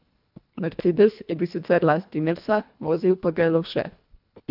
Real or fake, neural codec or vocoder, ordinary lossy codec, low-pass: fake; codec, 24 kHz, 1.5 kbps, HILCodec; AAC, 48 kbps; 5.4 kHz